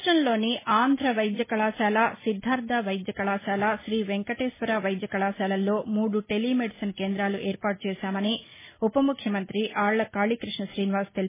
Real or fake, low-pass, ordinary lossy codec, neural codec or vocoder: real; 3.6 kHz; MP3, 16 kbps; none